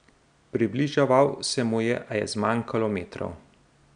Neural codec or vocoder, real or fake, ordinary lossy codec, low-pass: none; real; none; 9.9 kHz